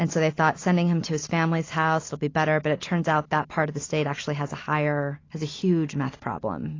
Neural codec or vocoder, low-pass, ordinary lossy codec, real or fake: none; 7.2 kHz; AAC, 32 kbps; real